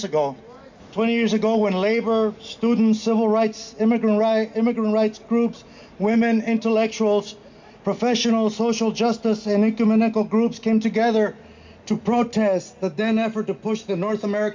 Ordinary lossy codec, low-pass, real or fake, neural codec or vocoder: MP3, 64 kbps; 7.2 kHz; real; none